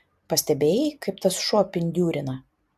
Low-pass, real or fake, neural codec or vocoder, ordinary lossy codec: 14.4 kHz; real; none; AAC, 96 kbps